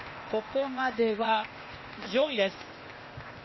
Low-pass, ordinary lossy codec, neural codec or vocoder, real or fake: 7.2 kHz; MP3, 24 kbps; codec, 16 kHz, 0.8 kbps, ZipCodec; fake